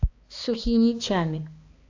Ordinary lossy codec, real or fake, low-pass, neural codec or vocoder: AAC, 48 kbps; fake; 7.2 kHz; codec, 16 kHz, 2 kbps, X-Codec, HuBERT features, trained on balanced general audio